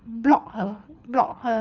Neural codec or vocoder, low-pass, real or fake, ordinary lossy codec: codec, 24 kHz, 6 kbps, HILCodec; 7.2 kHz; fake; none